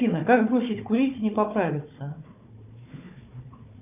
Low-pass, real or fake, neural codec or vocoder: 3.6 kHz; fake; codec, 16 kHz, 4 kbps, FunCodec, trained on Chinese and English, 50 frames a second